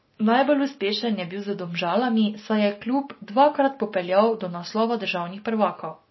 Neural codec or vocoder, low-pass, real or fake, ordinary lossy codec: none; 7.2 kHz; real; MP3, 24 kbps